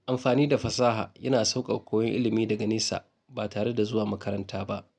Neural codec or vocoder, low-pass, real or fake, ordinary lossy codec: none; none; real; none